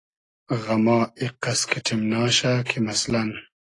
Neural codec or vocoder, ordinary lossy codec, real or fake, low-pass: none; AAC, 32 kbps; real; 10.8 kHz